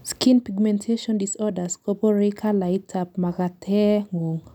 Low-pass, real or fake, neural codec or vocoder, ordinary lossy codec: 19.8 kHz; real; none; none